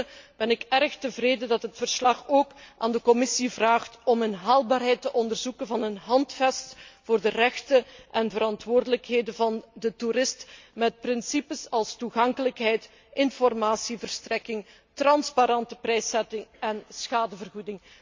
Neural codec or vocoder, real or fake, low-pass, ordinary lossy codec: none; real; 7.2 kHz; MP3, 64 kbps